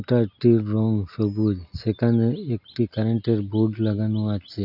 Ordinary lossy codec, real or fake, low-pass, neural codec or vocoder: none; real; 5.4 kHz; none